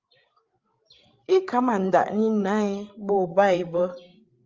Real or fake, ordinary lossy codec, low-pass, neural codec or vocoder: fake; Opus, 32 kbps; 7.2 kHz; codec, 16 kHz, 8 kbps, FreqCodec, larger model